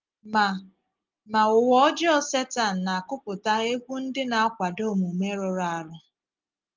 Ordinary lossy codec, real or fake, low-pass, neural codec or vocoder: Opus, 32 kbps; real; 7.2 kHz; none